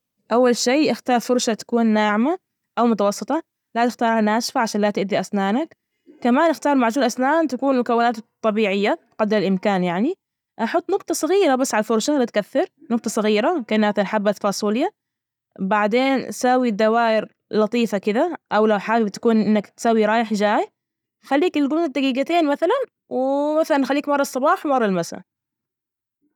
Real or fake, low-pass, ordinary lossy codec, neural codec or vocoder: real; 19.8 kHz; none; none